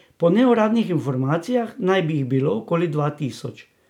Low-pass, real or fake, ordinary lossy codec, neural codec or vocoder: 19.8 kHz; real; none; none